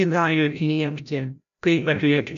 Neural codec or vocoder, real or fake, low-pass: codec, 16 kHz, 0.5 kbps, FreqCodec, larger model; fake; 7.2 kHz